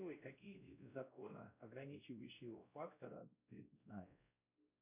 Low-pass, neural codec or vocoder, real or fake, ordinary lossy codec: 3.6 kHz; codec, 16 kHz, 1 kbps, X-Codec, WavLM features, trained on Multilingual LibriSpeech; fake; MP3, 32 kbps